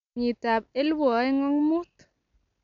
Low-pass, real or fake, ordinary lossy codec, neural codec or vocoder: 7.2 kHz; real; MP3, 96 kbps; none